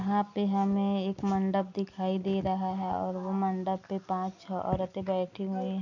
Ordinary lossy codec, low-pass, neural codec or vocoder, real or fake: none; 7.2 kHz; none; real